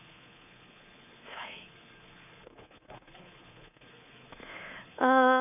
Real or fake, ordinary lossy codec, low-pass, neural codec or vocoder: fake; none; 3.6 kHz; codec, 24 kHz, 3.1 kbps, DualCodec